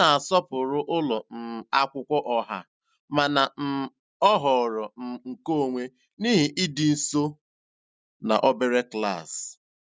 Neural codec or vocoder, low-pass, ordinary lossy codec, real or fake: none; none; none; real